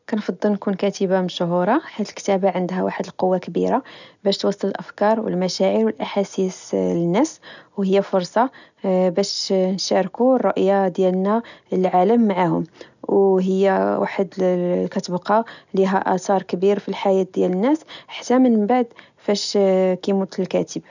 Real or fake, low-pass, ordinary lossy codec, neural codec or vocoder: real; 7.2 kHz; none; none